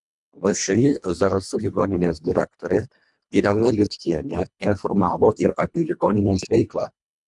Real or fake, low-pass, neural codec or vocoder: fake; 10.8 kHz; codec, 24 kHz, 1.5 kbps, HILCodec